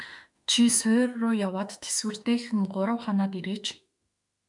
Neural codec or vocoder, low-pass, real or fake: autoencoder, 48 kHz, 32 numbers a frame, DAC-VAE, trained on Japanese speech; 10.8 kHz; fake